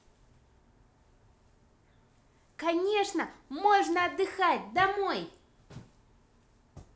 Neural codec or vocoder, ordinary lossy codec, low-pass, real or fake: none; none; none; real